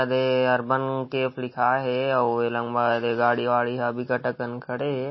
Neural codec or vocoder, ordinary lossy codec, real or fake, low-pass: none; MP3, 24 kbps; real; 7.2 kHz